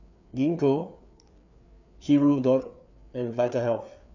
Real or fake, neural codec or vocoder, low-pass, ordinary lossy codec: fake; codec, 16 kHz, 4 kbps, FreqCodec, larger model; 7.2 kHz; none